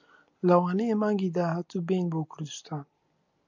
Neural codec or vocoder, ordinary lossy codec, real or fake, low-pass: none; MP3, 64 kbps; real; 7.2 kHz